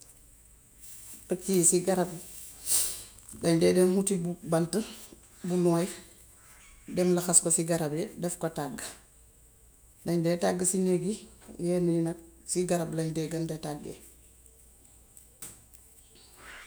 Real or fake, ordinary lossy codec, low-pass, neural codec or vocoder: fake; none; none; autoencoder, 48 kHz, 128 numbers a frame, DAC-VAE, trained on Japanese speech